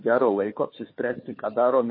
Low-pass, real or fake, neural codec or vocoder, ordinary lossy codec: 5.4 kHz; fake; codec, 16 kHz, 4 kbps, FunCodec, trained on Chinese and English, 50 frames a second; MP3, 24 kbps